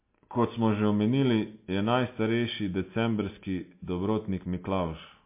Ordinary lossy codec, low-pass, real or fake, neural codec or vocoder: MP3, 24 kbps; 3.6 kHz; real; none